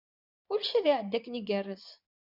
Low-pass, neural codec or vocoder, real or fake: 5.4 kHz; vocoder, 24 kHz, 100 mel bands, Vocos; fake